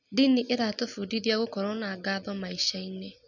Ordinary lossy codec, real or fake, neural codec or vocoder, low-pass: none; real; none; 7.2 kHz